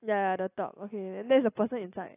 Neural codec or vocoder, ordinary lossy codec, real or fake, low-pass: none; AAC, 16 kbps; real; 3.6 kHz